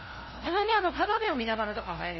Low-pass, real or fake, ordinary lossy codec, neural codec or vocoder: 7.2 kHz; fake; MP3, 24 kbps; codec, 16 kHz, 0.5 kbps, FunCodec, trained on LibriTTS, 25 frames a second